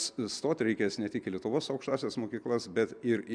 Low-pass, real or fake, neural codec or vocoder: 9.9 kHz; real; none